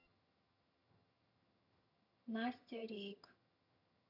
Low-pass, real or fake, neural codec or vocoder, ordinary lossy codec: 5.4 kHz; fake; vocoder, 22.05 kHz, 80 mel bands, HiFi-GAN; none